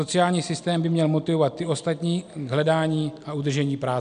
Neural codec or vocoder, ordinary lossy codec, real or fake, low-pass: none; MP3, 96 kbps; real; 9.9 kHz